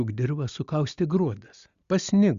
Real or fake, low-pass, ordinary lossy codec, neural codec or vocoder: real; 7.2 kHz; Opus, 64 kbps; none